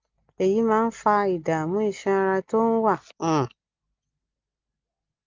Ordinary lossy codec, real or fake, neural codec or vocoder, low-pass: Opus, 32 kbps; real; none; 7.2 kHz